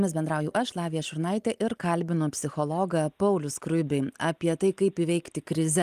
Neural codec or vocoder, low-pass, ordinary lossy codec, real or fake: none; 14.4 kHz; Opus, 32 kbps; real